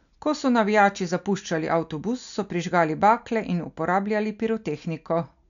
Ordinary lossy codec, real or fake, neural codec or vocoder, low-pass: none; real; none; 7.2 kHz